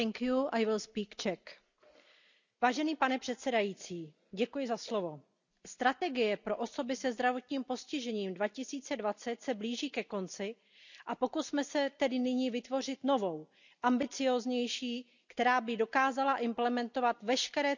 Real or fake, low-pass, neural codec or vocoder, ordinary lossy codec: real; 7.2 kHz; none; none